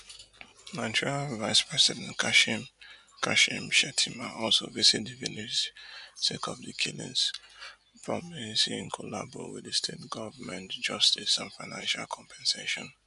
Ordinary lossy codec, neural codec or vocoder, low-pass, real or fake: none; none; 10.8 kHz; real